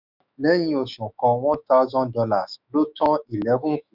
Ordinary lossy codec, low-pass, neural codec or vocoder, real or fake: none; 5.4 kHz; autoencoder, 48 kHz, 128 numbers a frame, DAC-VAE, trained on Japanese speech; fake